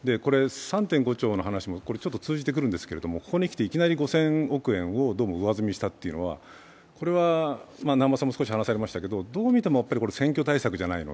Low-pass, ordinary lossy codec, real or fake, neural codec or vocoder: none; none; real; none